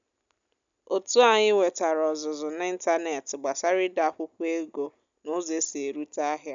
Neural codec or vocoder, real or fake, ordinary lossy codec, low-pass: none; real; none; 7.2 kHz